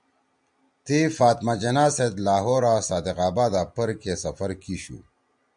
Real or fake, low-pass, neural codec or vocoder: real; 9.9 kHz; none